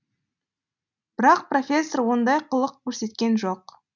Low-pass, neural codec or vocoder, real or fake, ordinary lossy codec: 7.2 kHz; none; real; none